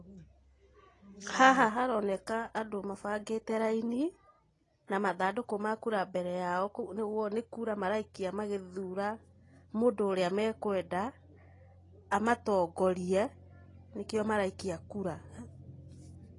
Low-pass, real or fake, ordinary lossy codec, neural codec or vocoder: 10.8 kHz; real; AAC, 32 kbps; none